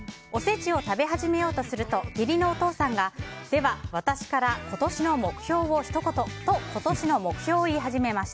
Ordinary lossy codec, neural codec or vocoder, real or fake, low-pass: none; none; real; none